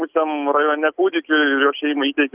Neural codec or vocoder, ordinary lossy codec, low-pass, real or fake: none; Opus, 32 kbps; 3.6 kHz; real